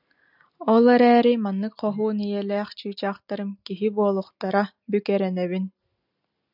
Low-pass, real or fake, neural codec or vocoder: 5.4 kHz; real; none